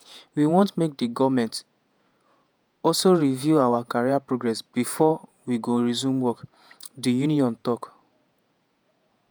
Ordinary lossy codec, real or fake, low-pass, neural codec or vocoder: none; fake; none; vocoder, 48 kHz, 128 mel bands, Vocos